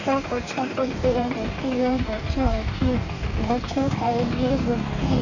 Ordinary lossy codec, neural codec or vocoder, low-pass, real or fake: none; codec, 44.1 kHz, 2.6 kbps, DAC; 7.2 kHz; fake